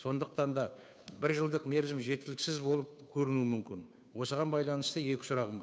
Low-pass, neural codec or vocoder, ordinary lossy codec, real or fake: none; codec, 16 kHz, 2 kbps, FunCodec, trained on Chinese and English, 25 frames a second; none; fake